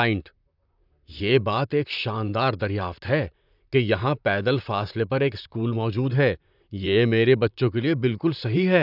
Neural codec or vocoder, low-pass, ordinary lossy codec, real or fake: vocoder, 44.1 kHz, 128 mel bands, Pupu-Vocoder; 5.4 kHz; none; fake